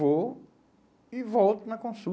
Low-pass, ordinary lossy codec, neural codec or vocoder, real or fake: none; none; none; real